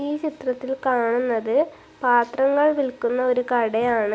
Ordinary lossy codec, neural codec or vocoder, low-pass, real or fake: none; none; none; real